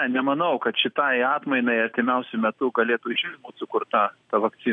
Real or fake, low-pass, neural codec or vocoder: real; 9.9 kHz; none